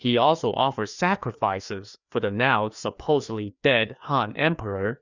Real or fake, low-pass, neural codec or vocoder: fake; 7.2 kHz; codec, 16 kHz, 2 kbps, FreqCodec, larger model